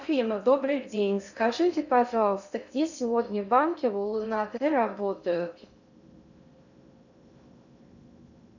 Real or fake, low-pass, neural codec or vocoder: fake; 7.2 kHz; codec, 16 kHz in and 24 kHz out, 0.6 kbps, FocalCodec, streaming, 2048 codes